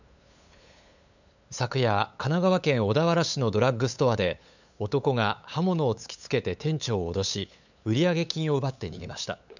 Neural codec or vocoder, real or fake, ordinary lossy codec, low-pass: codec, 16 kHz, 8 kbps, FunCodec, trained on LibriTTS, 25 frames a second; fake; none; 7.2 kHz